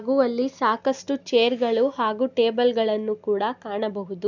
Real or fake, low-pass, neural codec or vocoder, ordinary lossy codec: real; 7.2 kHz; none; none